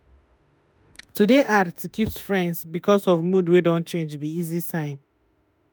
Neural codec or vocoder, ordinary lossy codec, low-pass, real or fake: autoencoder, 48 kHz, 32 numbers a frame, DAC-VAE, trained on Japanese speech; none; none; fake